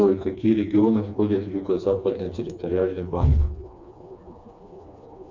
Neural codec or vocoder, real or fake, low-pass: codec, 16 kHz, 2 kbps, FreqCodec, smaller model; fake; 7.2 kHz